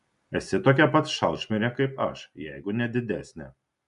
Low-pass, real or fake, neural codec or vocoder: 10.8 kHz; real; none